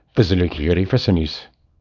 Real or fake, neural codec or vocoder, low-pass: fake; codec, 24 kHz, 0.9 kbps, WavTokenizer, small release; 7.2 kHz